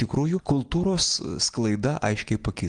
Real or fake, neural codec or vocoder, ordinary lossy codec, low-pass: real; none; Opus, 24 kbps; 9.9 kHz